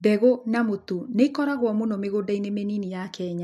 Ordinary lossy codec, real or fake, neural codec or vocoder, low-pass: MP3, 64 kbps; real; none; 14.4 kHz